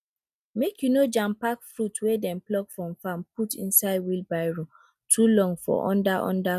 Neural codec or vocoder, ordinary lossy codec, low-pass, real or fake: none; none; 14.4 kHz; real